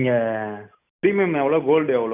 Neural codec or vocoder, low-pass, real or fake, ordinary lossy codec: none; 3.6 kHz; real; none